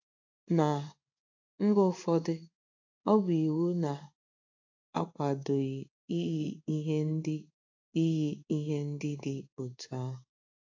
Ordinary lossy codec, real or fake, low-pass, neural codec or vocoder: AAC, 48 kbps; fake; 7.2 kHz; codec, 16 kHz in and 24 kHz out, 1 kbps, XY-Tokenizer